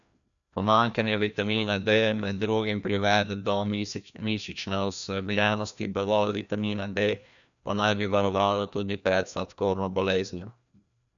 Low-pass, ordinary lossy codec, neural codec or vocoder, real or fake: 7.2 kHz; none; codec, 16 kHz, 1 kbps, FreqCodec, larger model; fake